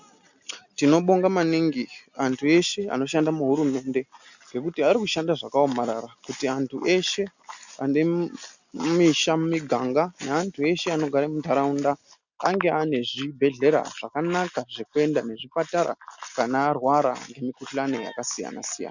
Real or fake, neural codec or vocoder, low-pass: real; none; 7.2 kHz